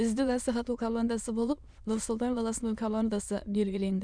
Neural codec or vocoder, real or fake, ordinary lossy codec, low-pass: autoencoder, 22.05 kHz, a latent of 192 numbers a frame, VITS, trained on many speakers; fake; none; 9.9 kHz